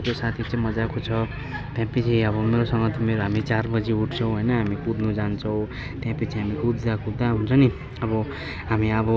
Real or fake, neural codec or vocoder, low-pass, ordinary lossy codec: real; none; none; none